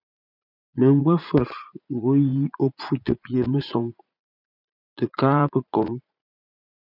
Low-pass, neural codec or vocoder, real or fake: 5.4 kHz; vocoder, 44.1 kHz, 80 mel bands, Vocos; fake